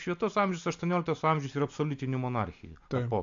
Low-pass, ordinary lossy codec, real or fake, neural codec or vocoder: 7.2 kHz; AAC, 64 kbps; real; none